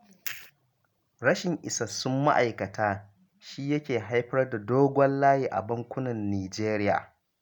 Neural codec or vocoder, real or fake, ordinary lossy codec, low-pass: none; real; none; none